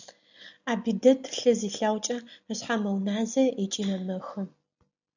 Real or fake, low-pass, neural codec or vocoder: real; 7.2 kHz; none